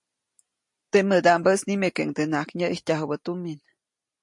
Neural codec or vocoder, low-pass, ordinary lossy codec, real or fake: none; 10.8 kHz; MP3, 48 kbps; real